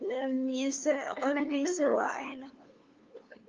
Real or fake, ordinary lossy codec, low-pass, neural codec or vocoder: fake; Opus, 24 kbps; 7.2 kHz; codec, 16 kHz, 2 kbps, FunCodec, trained on LibriTTS, 25 frames a second